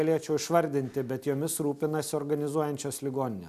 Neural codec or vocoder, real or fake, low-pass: none; real; 14.4 kHz